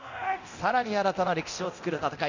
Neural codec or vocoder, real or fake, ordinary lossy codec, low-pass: codec, 24 kHz, 0.9 kbps, DualCodec; fake; none; 7.2 kHz